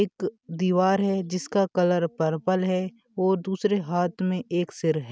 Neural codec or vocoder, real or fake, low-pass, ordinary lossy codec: none; real; none; none